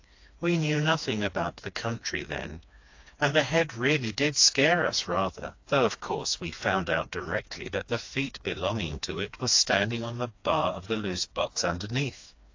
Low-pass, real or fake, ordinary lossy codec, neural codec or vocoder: 7.2 kHz; fake; AAC, 48 kbps; codec, 16 kHz, 2 kbps, FreqCodec, smaller model